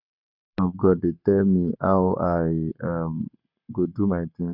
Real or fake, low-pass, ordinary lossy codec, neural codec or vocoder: fake; 5.4 kHz; none; codec, 44.1 kHz, 7.8 kbps, Pupu-Codec